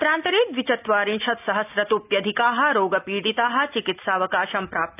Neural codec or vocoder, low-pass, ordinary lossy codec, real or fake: none; 3.6 kHz; AAC, 32 kbps; real